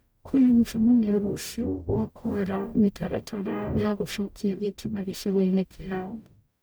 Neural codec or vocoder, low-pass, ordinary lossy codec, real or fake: codec, 44.1 kHz, 0.9 kbps, DAC; none; none; fake